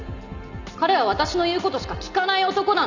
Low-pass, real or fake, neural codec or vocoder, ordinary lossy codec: 7.2 kHz; real; none; none